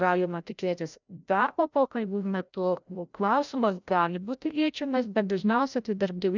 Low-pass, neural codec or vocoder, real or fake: 7.2 kHz; codec, 16 kHz, 0.5 kbps, FreqCodec, larger model; fake